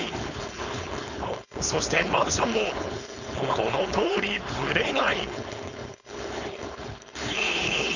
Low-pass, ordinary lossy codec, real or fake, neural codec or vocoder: 7.2 kHz; none; fake; codec, 16 kHz, 4.8 kbps, FACodec